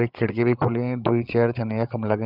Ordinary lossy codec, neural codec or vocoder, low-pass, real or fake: Opus, 32 kbps; codec, 16 kHz, 16 kbps, FreqCodec, larger model; 5.4 kHz; fake